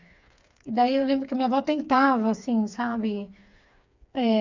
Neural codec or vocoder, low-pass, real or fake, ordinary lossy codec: codec, 16 kHz, 4 kbps, FreqCodec, smaller model; 7.2 kHz; fake; MP3, 64 kbps